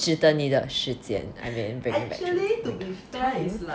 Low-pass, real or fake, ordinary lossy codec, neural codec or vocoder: none; real; none; none